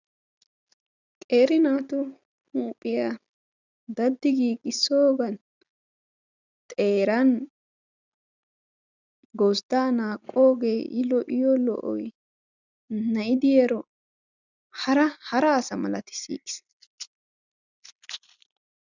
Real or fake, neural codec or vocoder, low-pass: real; none; 7.2 kHz